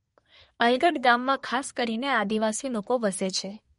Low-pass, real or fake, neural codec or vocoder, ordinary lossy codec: 10.8 kHz; fake; codec, 24 kHz, 1 kbps, SNAC; MP3, 48 kbps